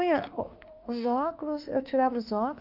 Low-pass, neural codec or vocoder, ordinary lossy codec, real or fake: 5.4 kHz; autoencoder, 48 kHz, 32 numbers a frame, DAC-VAE, trained on Japanese speech; Opus, 24 kbps; fake